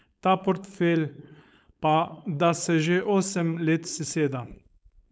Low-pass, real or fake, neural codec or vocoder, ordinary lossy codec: none; fake; codec, 16 kHz, 4.8 kbps, FACodec; none